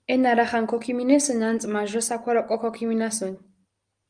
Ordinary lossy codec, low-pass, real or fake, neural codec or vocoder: Opus, 32 kbps; 9.9 kHz; real; none